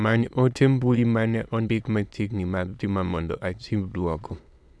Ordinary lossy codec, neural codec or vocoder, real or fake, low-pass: none; autoencoder, 22.05 kHz, a latent of 192 numbers a frame, VITS, trained on many speakers; fake; none